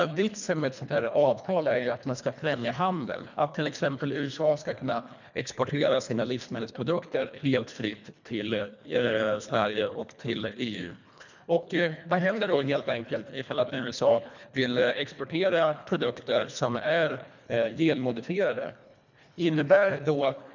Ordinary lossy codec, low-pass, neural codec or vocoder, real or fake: none; 7.2 kHz; codec, 24 kHz, 1.5 kbps, HILCodec; fake